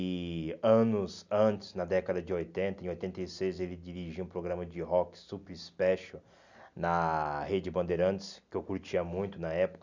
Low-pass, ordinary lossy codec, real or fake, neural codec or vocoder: 7.2 kHz; none; real; none